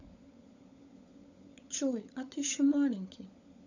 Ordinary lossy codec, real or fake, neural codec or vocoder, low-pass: none; fake; codec, 16 kHz, 16 kbps, FunCodec, trained on LibriTTS, 50 frames a second; 7.2 kHz